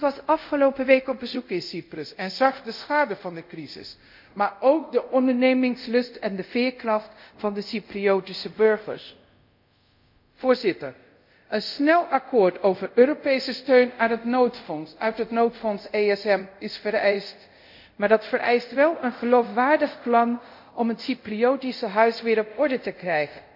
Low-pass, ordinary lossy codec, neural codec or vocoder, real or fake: 5.4 kHz; MP3, 48 kbps; codec, 24 kHz, 0.5 kbps, DualCodec; fake